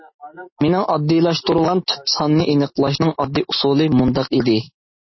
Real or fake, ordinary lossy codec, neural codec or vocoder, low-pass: real; MP3, 24 kbps; none; 7.2 kHz